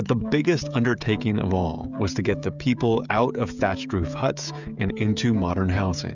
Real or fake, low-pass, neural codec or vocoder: fake; 7.2 kHz; codec, 16 kHz, 16 kbps, FreqCodec, smaller model